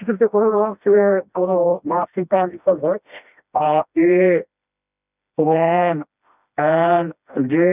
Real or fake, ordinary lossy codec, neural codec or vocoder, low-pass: fake; none; codec, 16 kHz, 1 kbps, FreqCodec, smaller model; 3.6 kHz